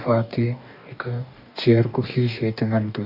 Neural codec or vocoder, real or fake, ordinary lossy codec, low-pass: codec, 44.1 kHz, 2.6 kbps, DAC; fake; none; 5.4 kHz